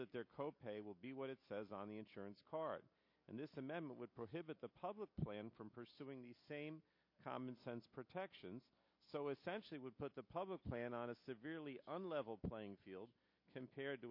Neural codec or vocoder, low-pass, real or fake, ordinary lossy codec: none; 5.4 kHz; real; MP3, 32 kbps